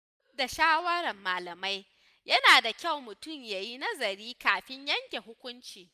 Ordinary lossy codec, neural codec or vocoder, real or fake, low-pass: none; none; real; 14.4 kHz